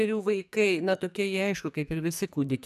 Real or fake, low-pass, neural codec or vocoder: fake; 14.4 kHz; codec, 44.1 kHz, 2.6 kbps, SNAC